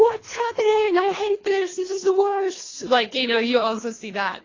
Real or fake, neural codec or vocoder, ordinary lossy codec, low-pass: fake; codec, 24 kHz, 1.5 kbps, HILCodec; AAC, 32 kbps; 7.2 kHz